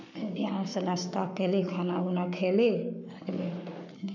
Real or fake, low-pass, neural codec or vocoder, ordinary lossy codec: fake; 7.2 kHz; codec, 44.1 kHz, 7.8 kbps, Pupu-Codec; none